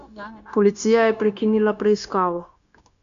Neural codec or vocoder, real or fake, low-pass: codec, 16 kHz, 0.9 kbps, LongCat-Audio-Codec; fake; 7.2 kHz